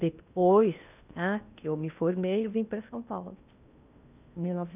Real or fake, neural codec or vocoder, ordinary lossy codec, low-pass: fake; codec, 16 kHz in and 24 kHz out, 0.8 kbps, FocalCodec, streaming, 65536 codes; none; 3.6 kHz